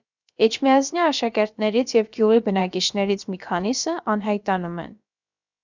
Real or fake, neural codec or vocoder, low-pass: fake; codec, 16 kHz, about 1 kbps, DyCAST, with the encoder's durations; 7.2 kHz